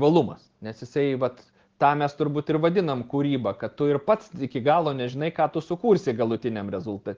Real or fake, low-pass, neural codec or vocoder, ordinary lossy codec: real; 7.2 kHz; none; Opus, 16 kbps